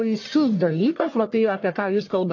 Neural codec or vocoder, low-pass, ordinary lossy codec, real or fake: codec, 44.1 kHz, 1.7 kbps, Pupu-Codec; 7.2 kHz; AAC, 48 kbps; fake